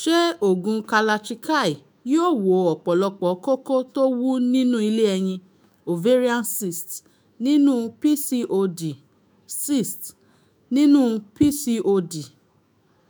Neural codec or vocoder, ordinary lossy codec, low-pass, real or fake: autoencoder, 48 kHz, 128 numbers a frame, DAC-VAE, trained on Japanese speech; none; none; fake